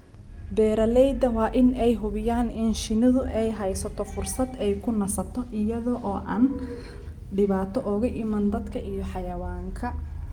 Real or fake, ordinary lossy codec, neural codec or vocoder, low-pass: real; Opus, 32 kbps; none; 19.8 kHz